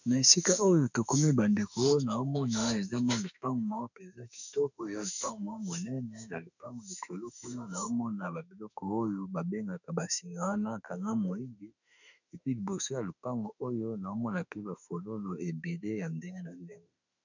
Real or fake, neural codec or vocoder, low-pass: fake; autoencoder, 48 kHz, 32 numbers a frame, DAC-VAE, trained on Japanese speech; 7.2 kHz